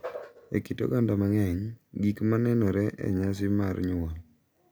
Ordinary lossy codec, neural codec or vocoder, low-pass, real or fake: none; none; none; real